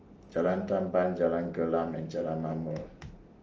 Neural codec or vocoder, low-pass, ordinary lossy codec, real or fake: none; 7.2 kHz; Opus, 24 kbps; real